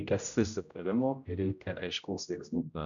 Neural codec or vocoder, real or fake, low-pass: codec, 16 kHz, 0.5 kbps, X-Codec, HuBERT features, trained on general audio; fake; 7.2 kHz